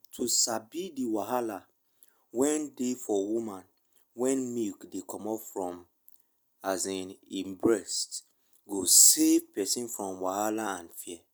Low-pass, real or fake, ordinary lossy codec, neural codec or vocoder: none; real; none; none